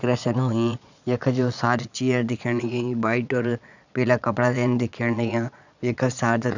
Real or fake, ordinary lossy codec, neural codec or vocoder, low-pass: fake; none; vocoder, 22.05 kHz, 80 mel bands, Vocos; 7.2 kHz